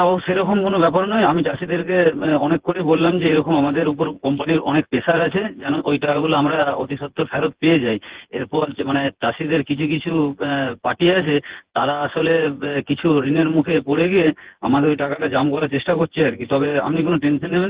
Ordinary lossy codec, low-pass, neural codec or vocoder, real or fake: Opus, 16 kbps; 3.6 kHz; vocoder, 24 kHz, 100 mel bands, Vocos; fake